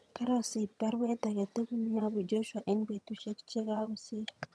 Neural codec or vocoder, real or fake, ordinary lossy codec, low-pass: vocoder, 22.05 kHz, 80 mel bands, HiFi-GAN; fake; none; none